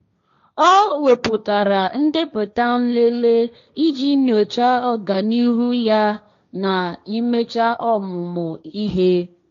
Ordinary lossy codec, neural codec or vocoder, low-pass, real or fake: AAC, 64 kbps; codec, 16 kHz, 1.1 kbps, Voila-Tokenizer; 7.2 kHz; fake